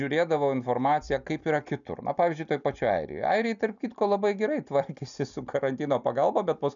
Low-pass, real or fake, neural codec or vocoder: 7.2 kHz; real; none